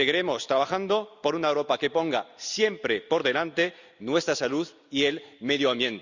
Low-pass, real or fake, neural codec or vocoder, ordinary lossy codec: 7.2 kHz; real; none; Opus, 64 kbps